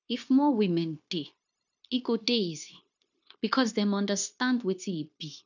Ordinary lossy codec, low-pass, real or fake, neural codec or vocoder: none; 7.2 kHz; fake; codec, 16 kHz, 0.9 kbps, LongCat-Audio-Codec